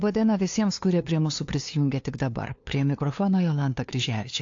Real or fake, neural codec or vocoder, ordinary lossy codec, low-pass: fake; codec, 16 kHz, 2 kbps, FunCodec, trained on LibriTTS, 25 frames a second; AAC, 48 kbps; 7.2 kHz